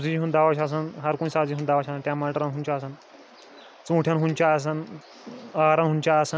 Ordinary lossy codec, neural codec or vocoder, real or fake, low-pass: none; none; real; none